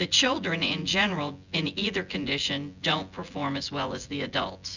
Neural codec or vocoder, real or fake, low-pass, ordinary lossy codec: vocoder, 24 kHz, 100 mel bands, Vocos; fake; 7.2 kHz; Opus, 64 kbps